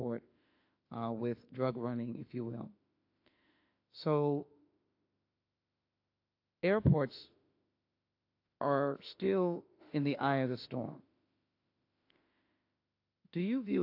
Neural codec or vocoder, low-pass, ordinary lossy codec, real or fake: autoencoder, 48 kHz, 32 numbers a frame, DAC-VAE, trained on Japanese speech; 5.4 kHz; AAC, 32 kbps; fake